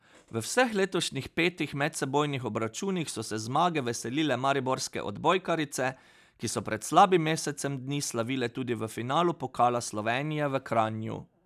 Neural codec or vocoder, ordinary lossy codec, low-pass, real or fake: none; none; 14.4 kHz; real